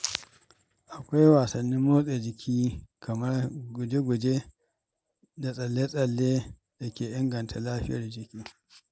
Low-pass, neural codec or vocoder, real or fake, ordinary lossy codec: none; none; real; none